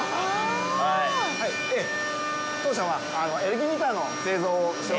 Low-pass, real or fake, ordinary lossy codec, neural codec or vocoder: none; real; none; none